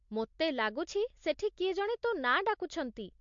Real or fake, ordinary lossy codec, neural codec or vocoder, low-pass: real; MP3, 48 kbps; none; 7.2 kHz